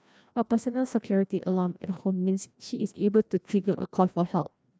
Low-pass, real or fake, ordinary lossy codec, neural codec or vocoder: none; fake; none; codec, 16 kHz, 1 kbps, FreqCodec, larger model